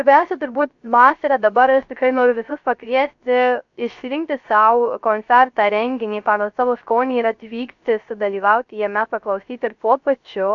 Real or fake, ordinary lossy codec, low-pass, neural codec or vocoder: fake; AAC, 64 kbps; 7.2 kHz; codec, 16 kHz, 0.3 kbps, FocalCodec